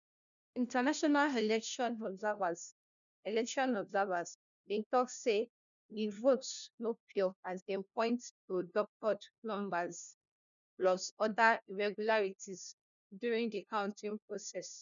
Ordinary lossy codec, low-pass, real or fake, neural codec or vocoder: none; 7.2 kHz; fake; codec, 16 kHz, 1 kbps, FunCodec, trained on LibriTTS, 50 frames a second